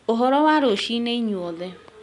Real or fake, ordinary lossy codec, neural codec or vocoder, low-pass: real; none; none; 10.8 kHz